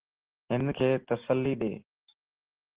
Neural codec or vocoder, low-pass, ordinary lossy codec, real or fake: none; 3.6 kHz; Opus, 16 kbps; real